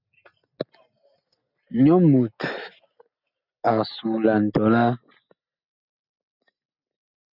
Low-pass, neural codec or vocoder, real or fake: 5.4 kHz; none; real